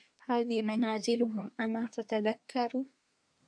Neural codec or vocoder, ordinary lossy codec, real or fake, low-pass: codec, 24 kHz, 1 kbps, SNAC; AAC, 64 kbps; fake; 9.9 kHz